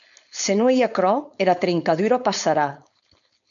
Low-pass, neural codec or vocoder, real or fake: 7.2 kHz; codec, 16 kHz, 4.8 kbps, FACodec; fake